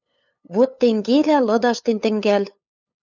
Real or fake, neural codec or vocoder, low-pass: fake; codec, 16 kHz, 8 kbps, FunCodec, trained on LibriTTS, 25 frames a second; 7.2 kHz